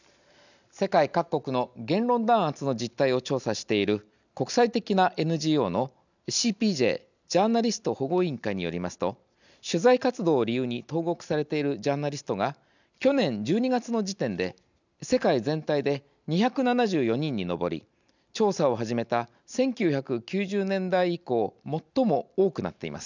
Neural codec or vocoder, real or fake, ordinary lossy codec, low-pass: none; real; none; 7.2 kHz